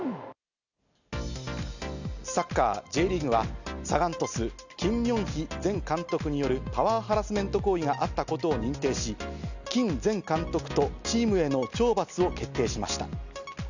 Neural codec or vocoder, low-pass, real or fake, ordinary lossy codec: none; 7.2 kHz; real; none